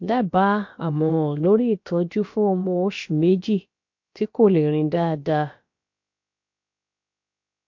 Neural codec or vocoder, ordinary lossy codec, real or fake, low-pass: codec, 16 kHz, about 1 kbps, DyCAST, with the encoder's durations; MP3, 48 kbps; fake; 7.2 kHz